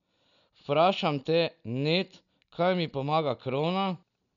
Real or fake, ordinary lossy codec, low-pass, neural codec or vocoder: real; none; 7.2 kHz; none